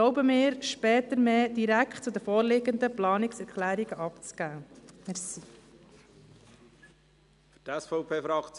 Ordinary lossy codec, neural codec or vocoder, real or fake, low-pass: none; none; real; 10.8 kHz